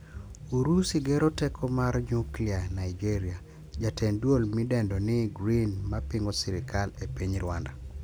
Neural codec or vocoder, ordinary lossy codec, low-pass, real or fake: none; none; none; real